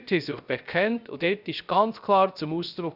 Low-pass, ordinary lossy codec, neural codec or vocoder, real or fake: 5.4 kHz; none; codec, 16 kHz, 0.3 kbps, FocalCodec; fake